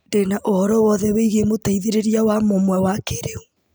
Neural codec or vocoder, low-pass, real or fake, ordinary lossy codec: none; none; real; none